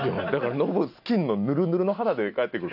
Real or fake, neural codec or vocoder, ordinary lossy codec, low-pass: real; none; none; 5.4 kHz